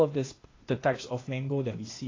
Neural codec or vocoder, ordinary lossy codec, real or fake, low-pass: codec, 16 kHz, 0.8 kbps, ZipCodec; AAC, 32 kbps; fake; 7.2 kHz